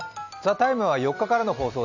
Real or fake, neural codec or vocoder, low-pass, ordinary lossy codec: real; none; 7.2 kHz; none